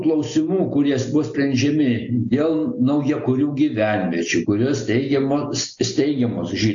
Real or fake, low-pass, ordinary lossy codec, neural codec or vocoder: real; 7.2 kHz; AAC, 64 kbps; none